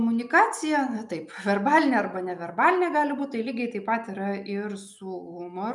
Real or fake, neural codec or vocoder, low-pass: real; none; 10.8 kHz